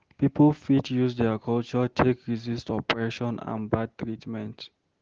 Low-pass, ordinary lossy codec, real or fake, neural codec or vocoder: 7.2 kHz; Opus, 16 kbps; real; none